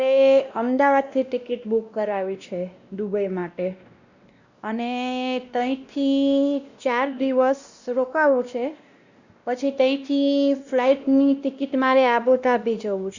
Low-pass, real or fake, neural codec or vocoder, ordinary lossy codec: 7.2 kHz; fake; codec, 16 kHz, 1 kbps, X-Codec, WavLM features, trained on Multilingual LibriSpeech; Opus, 64 kbps